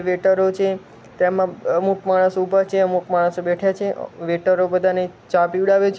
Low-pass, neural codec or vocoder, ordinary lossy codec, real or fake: none; none; none; real